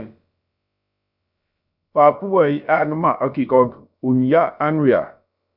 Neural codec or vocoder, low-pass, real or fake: codec, 16 kHz, about 1 kbps, DyCAST, with the encoder's durations; 5.4 kHz; fake